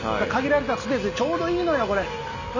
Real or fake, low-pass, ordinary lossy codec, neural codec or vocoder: real; 7.2 kHz; none; none